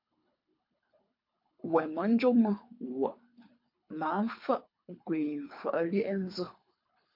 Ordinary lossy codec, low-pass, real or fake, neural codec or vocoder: MP3, 32 kbps; 5.4 kHz; fake; codec, 24 kHz, 3 kbps, HILCodec